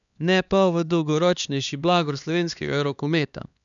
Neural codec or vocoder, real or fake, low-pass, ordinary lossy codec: codec, 16 kHz, 2 kbps, X-Codec, HuBERT features, trained on LibriSpeech; fake; 7.2 kHz; none